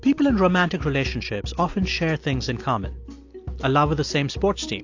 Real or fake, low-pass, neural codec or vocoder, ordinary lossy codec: real; 7.2 kHz; none; AAC, 48 kbps